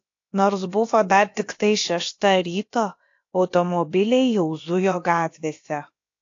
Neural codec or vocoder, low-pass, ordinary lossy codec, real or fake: codec, 16 kHz, about 1 kbps, DyCAST, with the encoder's durations; 7.2 kHz; AAC, 48 kbps; fake